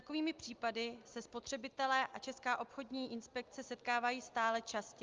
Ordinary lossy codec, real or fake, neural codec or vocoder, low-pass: Opus, 32 kbps; real; none; 7.2 kHz